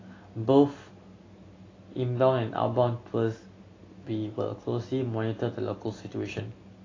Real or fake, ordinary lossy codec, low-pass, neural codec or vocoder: real; AAC, 32 kbps; 7.2 kHz; none